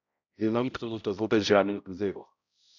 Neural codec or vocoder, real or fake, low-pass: codec, 16 kHz, 0.5 kbps, X-Codec, HuBERT features, trained on balanced general audio; fake; 7.2 kHz